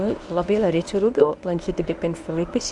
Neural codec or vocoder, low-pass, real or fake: codec, 24 kHz, 0.9 kbps, WavTokenizer, medium speech release version 1; 10.8 kHz; fake